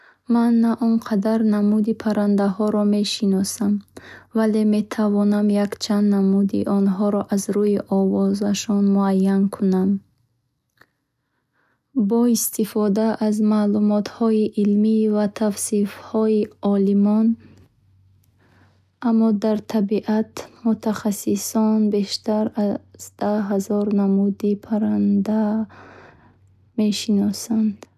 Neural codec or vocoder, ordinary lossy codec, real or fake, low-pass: none; none; real; 14.4 kHz